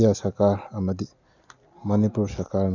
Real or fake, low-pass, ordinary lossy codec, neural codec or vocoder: real; 7.2 kHz; none; none